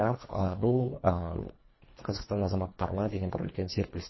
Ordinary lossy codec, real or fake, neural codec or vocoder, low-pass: MP3, 24 kbps; fake; codec, 24 kHz, 1.5 kbps, HILCodec; 7.2 kHz